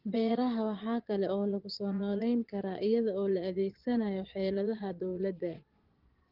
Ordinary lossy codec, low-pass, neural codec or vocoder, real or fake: Opus, 16 kbps; 5.4 kHz; vocoder, 22.05 kHz, 80 mel bands, Vocos; fake